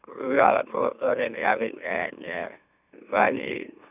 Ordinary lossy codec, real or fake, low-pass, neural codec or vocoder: none; fake; 3.6 kHz; autoencoder, 44.1 kHz, a latent of 192 numbers a frame, MeloTTS